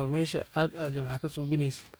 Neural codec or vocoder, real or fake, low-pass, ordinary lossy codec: codec, 44.1 kHz, 2.6 kbps, DAC; fake; none; none